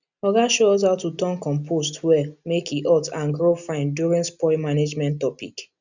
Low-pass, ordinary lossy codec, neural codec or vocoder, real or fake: 7.2 kHz; none; none; real